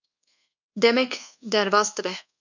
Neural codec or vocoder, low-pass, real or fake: codec, 24 kHz, 1.2 kbps, DualCodec; 7.2 kHz; fake